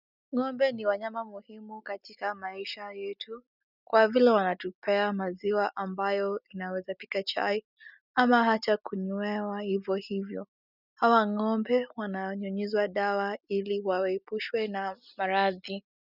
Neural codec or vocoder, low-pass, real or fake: none; 5.4 kHz; real